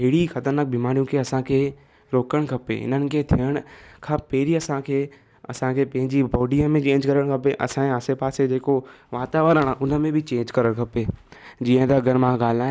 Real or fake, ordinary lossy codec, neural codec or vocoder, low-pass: real; none; none; none